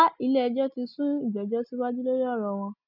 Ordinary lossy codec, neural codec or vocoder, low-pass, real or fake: none; none; 5.4 kHz; real